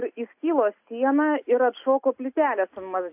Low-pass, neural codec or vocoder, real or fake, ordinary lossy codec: 3.6 kHz; none; real; AAC, 32 kbps